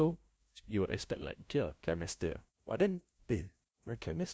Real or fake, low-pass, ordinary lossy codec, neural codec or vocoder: fake; none; none; codec, 16 kHz, 0.5 kbps, FunCodec, trained on LibriTTS, 25 frames a second